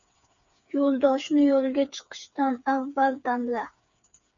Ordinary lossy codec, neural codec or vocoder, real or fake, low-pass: MP3, 96 kbps; codec, 16 kHz, 4 kbps, FreqCodec, smaller model; fake; 7.2 kHz